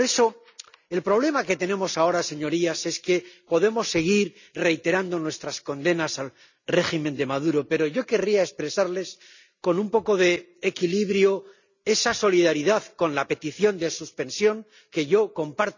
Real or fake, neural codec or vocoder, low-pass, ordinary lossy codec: real; none; 7.2 kHz; none